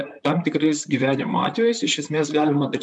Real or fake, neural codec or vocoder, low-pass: fake; vocoder, 44.1 kHz, 128 mel bands, Pupu-Vocoder; 10.8 kHz